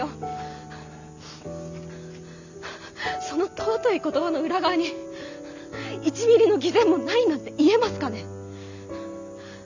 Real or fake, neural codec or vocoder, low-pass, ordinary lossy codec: real; none; 7.2 kHz; none